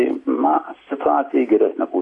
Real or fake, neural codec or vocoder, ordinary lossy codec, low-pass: real; none; AAC, 32 kbps; 10.8 kHz